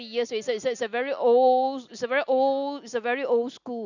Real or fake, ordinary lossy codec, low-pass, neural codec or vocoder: real; none; 7.2 kHz; none